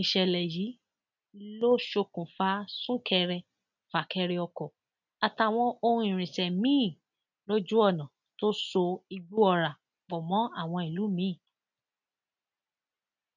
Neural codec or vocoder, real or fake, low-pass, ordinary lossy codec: none; real; 7.2 kHz; none